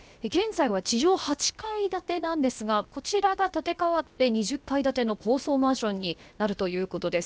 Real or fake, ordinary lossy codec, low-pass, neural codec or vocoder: fake; none; none; codec, 16 kHz, 0.7 kbps, FocalCodec